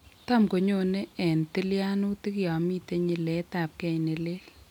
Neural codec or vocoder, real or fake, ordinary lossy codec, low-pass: none; real; none; 19.8 kHz